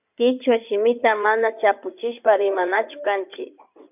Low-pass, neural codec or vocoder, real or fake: 3.6 kHz; codec, 16 kHz in and 24 kHz out, 2.2 kbps, FireRedTTS-2 codec; fake